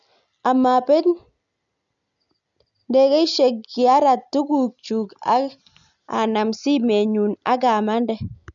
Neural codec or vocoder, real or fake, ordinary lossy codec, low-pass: none; real; none; 7.2 kHz